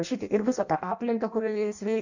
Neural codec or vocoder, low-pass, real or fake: codec, 16 kHz in and 24 kHz out, 0.6 kbps, FireRedTTS-2 codec; 7.2 kHz; fake